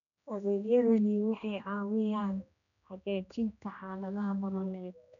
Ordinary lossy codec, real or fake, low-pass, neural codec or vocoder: none; fake; 7.2 kHz; codec, 16 kHz, 1 kbps, X-Codec, HuBERT features, trained on general audio